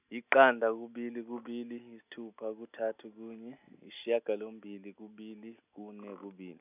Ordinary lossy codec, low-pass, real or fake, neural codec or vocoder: none; 3.6 kHz; real; none